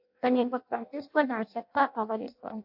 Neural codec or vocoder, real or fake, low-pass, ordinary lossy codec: codec, 16 kHz in and 24 kHz out, 0.6 kbps, FireRedTTS-2 codec; fake; 5.4 kHz; AAC, 48 kbps